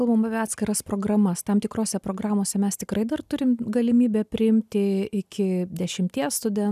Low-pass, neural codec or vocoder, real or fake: 14.4 kHz; none; real